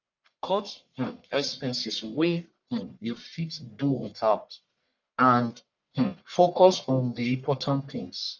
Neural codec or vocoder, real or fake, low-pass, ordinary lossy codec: codec, 44.1 kHz, 1.7 kbps, Pupu-Codec; fake; 7.2 kHz; none